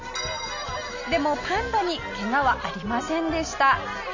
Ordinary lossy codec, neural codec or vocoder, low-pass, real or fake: none; none; 7.2 kHz; real